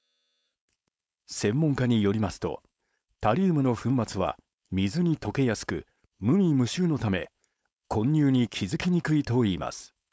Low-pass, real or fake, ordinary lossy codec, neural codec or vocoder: none; fake; none; codec, 16 kHz, 4.8 kbps, FACodec